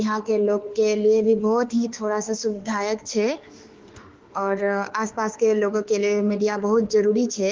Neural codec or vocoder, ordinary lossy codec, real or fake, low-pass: autoencoder, 48 kHz, 32 numbers a frame, DAC-VAE, trained on Japanese speech; Opus, 16 kbps; fake; 7.2 kHz